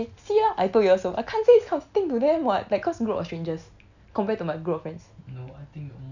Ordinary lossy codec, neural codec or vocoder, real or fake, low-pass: none; none; real; 7.2 kHz